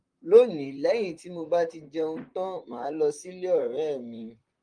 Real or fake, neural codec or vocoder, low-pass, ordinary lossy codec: fake; vocoder, 44.1 kHz, 128 mel bands, Pupu-Vocoder; 9.9 kHz; Opus, 32 kbps